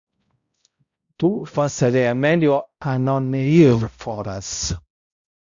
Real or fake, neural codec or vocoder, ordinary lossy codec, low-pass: fake; codec, 16 kHz, 0.5 kbps, X-Codec, HuBERT features, trained on balanced general audio; Opus, 64 kbps; 7.2 kHz